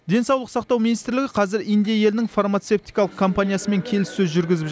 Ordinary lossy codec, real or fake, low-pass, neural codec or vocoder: none; real; none; none